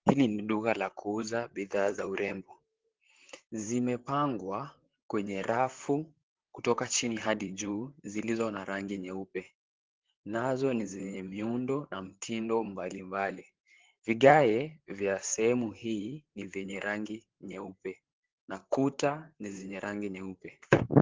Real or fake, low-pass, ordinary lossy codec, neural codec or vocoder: fake; 7.2 kHz; Opus, 16 kbps; vocoder, 44.1 kHz, 128 mel bands, Pupu-Vocoder